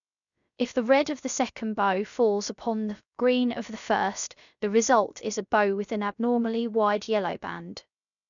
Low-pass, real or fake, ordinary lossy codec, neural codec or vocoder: 7.2 kHz; fake; none; codec, 16 kHz, 0.3 kbps, FocalCodec